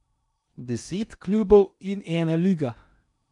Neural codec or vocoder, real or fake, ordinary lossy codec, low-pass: codec, 16 kHz in and 24 kHz out, 0.8 kbps, FocalCodec, streaming, 65536 codes; fake; none; 10.8 kHz